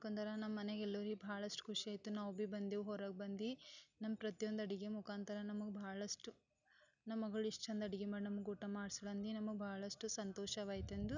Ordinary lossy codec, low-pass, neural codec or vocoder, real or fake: none; 7.2 kHz; none; real